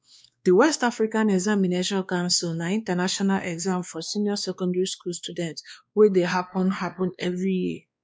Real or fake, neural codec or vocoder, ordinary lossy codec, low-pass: fake; codec, 16 kHz, 2 kbps, X-Codec, WavLM features, trained on Multilingual LibriSpeech; none; none